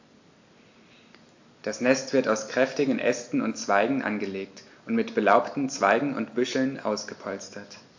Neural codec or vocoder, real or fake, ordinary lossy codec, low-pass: none; real; AAC, 48 kbps; 7.2 kHz